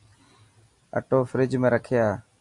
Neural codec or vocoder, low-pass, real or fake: none; 10.8 kHz; real